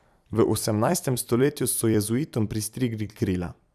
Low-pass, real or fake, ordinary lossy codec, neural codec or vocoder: 14.4 kHz; fake; none; vocoder, 44.1 kHz, 128 mel bands every 256 samples, BigVGAN v2